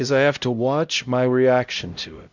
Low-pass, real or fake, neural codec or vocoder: 7.2 kHz; fake; codec, 16 kHz, 0.5 kbps, X-Codec, HuBERT features, trained on LibriSpeech